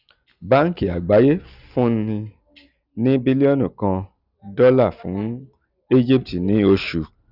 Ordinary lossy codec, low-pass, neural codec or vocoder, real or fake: none; 5.4 kHz; none; real